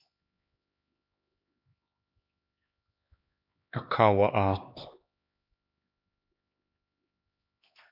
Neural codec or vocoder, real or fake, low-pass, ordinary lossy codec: codec, 16 kHz, 2 kbps, X-Codec, HuBERT features, trained on LibriSpeech; fake; 5.4 kHz; MP3, 48 kbps